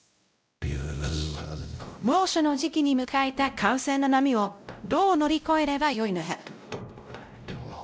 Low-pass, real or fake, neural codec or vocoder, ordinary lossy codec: none; fake; codec, 16 kHz, 0.5 kbps, X-Codec, WavLM features, trained on Multilingual LibriSpeech; none